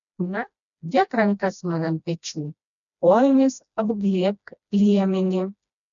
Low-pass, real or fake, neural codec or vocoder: 7.2 kHz; fake; codec, 16 kHz, 1 kbps, FreqCodec, smaller model